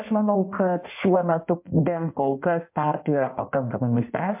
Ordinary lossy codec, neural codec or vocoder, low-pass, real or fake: MP3, 32 kbps; codec, 16 kHz in and 24 kHz out, 1.1 kbps, FireRedTTS-2 codec; 3.6 kHz; fake